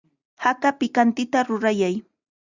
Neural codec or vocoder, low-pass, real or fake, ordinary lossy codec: none; 7.2 kHz; real; Opus, 64 kbps